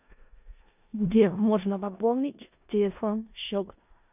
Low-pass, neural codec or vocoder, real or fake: 3.6 kHz; codec, 16 kHz in and 24 kHz out, 0.4 kbps, LongCat-Audio-Codec, four codebook decoder; fake